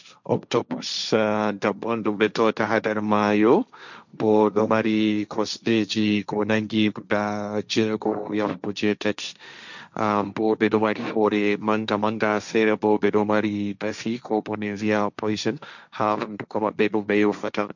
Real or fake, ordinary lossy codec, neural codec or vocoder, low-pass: fake; none; codec, 16 kHz, 1.1 kbps, Voila-Tokenizer; 7.2 kHz